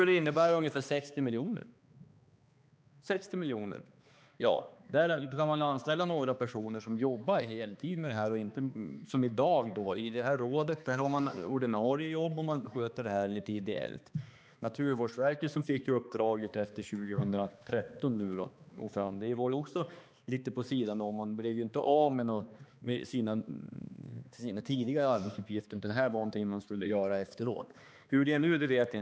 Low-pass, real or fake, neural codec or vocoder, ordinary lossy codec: none; fake; codec, 16 kHz, 2 kbps, X-Codec, HuBERT features, trained on balanced general audio; none